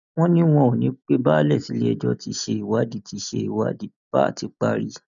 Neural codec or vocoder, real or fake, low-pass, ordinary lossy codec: none; real; 7.2 kHz; none